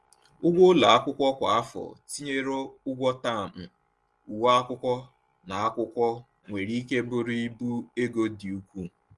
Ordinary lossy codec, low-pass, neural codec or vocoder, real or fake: Opus, 24 kbps; 10.8 kHz; none; real